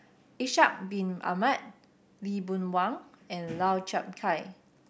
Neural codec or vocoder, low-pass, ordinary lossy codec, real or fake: none; none; none; real